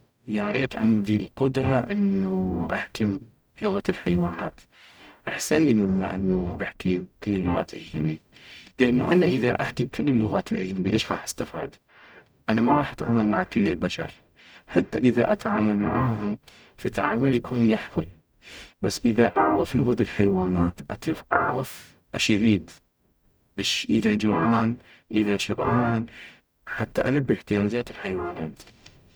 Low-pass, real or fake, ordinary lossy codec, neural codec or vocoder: none; fake; none; codec, 44.1 kHz, 0.9 kbps, DAC